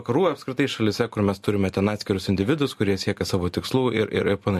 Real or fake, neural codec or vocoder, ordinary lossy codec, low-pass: real; none; MP3, 64 kbps; 14.4 kHz